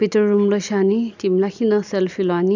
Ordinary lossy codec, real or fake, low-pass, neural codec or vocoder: none; real; 7.2 kHz; none